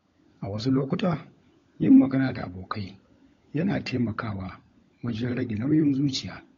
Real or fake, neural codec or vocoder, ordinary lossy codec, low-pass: fake; codec, 16 kHz, 16 kbps, FunCodec, trained on LibriTTS, 50 frames a second; AAC, 32 kbps; 7.2 kHz